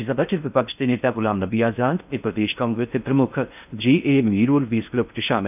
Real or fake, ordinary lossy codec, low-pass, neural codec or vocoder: fake; none; 3.6 kHz; codec, 16 kHz in and 24 kHz out, 0.6 kbps, FocalCodec, streaming, 4096 codes